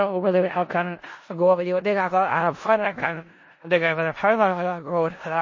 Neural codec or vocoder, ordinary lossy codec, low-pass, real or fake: codec, 16 kHz in and 24 kHz out, 0.4 kbps, LongCat-Audio-Codec, four codebook decoder; MP3, 32 kbps; 7.2 kHz; fake